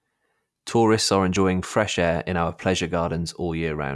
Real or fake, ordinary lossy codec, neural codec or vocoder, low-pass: real; none; none; none